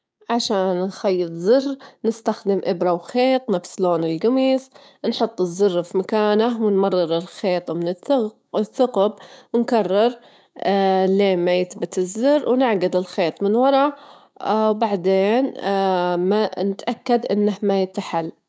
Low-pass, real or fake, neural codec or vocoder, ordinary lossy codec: none; fake; codec, 16 kHz, 6 kbps, DAC; none